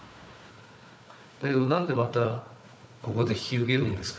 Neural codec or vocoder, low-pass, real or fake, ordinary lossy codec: codec, 16 kHz, 4 kbps, FunCodec, trained on Chinese and English, 50 frames a second; none; fake; none